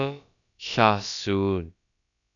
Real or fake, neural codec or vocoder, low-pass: fake; codec, 16 kHz, about 1 kbps, DyCAST, with the encoder's durations; 7.2 kHz